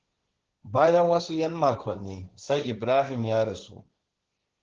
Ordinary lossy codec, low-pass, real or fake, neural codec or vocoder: Opus, 16 kbps; 7.2 kHz; fake; codec, 16 kHz, 1.1 kbps, Voila-Tokenizer